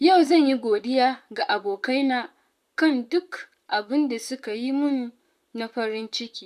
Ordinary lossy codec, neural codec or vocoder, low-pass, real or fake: none; vocoder, 44.1 kHz, 128 mel bands, Pupu-Vocoder; 14.4 kHz; fake